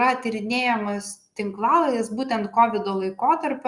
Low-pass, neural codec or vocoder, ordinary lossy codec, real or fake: 10.8 kHz; none; Opus, 64 kbps; real